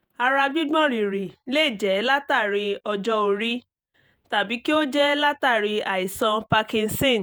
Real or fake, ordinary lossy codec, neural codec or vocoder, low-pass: fake; none; vocoder, 48 kHz, 128 mel bands, Vocos; none